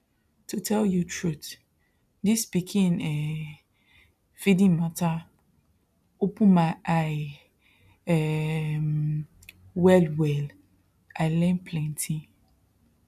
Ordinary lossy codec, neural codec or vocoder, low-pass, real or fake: none; none; 14.4 kHz; real